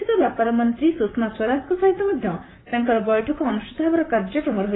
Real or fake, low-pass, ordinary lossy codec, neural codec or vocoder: fake; 7.2 kHz; AAC, 16 kbps; vocoder, 44.1 kHz, 128 mel bands, Pupu-Vocoder